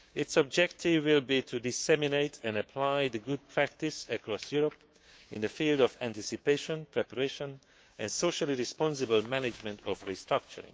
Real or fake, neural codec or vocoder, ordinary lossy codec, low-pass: fake; codec, 16 kHz, 6 kbps, DAC; none; none